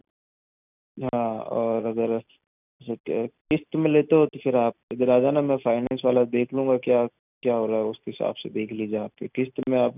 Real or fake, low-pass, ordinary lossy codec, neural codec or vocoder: real; 3.6 kHz; none; none